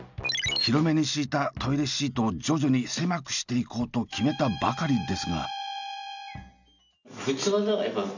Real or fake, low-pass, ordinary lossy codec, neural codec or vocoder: real; 7.2 kHz; none; none